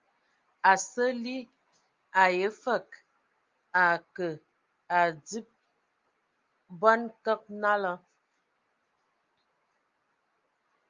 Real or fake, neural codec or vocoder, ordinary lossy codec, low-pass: real; none; Opus, 32 kbps; 7.2 kHz